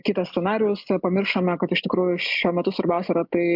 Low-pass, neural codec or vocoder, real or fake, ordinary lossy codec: 5.4 kHz; none; real; MP3, 48 kbps